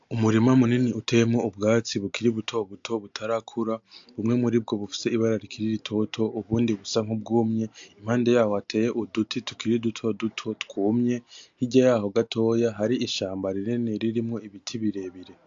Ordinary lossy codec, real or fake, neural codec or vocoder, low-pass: AAC, 64 kbps; real; none; 7.2 kHz